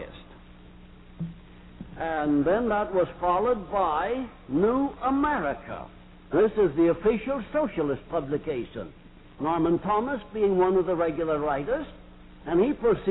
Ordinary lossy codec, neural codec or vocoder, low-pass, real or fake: AAC, 16 kbps; none; 7.2 kHz; real